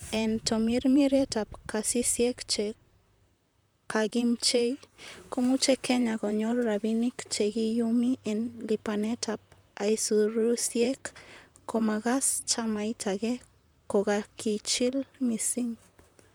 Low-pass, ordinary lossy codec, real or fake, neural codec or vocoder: none; none; fake; vocoder, 44.1 kHz, 128 mel bands, Pupu-Vocoder